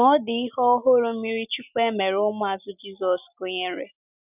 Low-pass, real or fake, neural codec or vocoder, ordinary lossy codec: 3.6 kHz; real; none; none